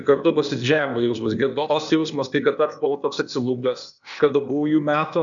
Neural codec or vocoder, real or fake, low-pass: codec, 16 kHz, 0.8 kbps, ZipCodec; fake; 7.2 kHz